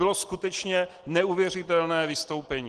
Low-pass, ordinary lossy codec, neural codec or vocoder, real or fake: 9.9 kHz; Opus, 16 kbps; none; real